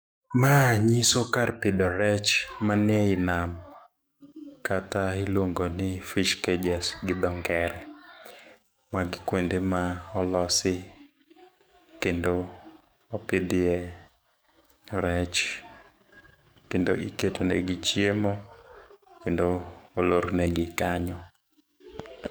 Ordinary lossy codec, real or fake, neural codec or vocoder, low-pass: none; fake; codec, 44.1 kHz, 7.8 kbps, DAC; none